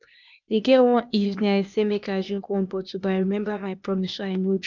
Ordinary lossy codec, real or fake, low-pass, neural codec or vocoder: none; fake; 7.2 kHz; codec, 16 kHz, 0.8 kbps, ZipCodec